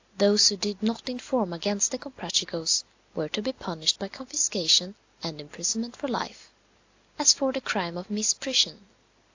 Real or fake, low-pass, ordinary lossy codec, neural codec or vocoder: real; 7.2 kHz; AAC, 48 kbps; none